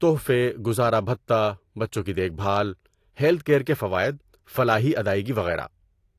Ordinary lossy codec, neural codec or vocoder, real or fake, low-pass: AAC, 48 kbps; none; real; 14.4 kHz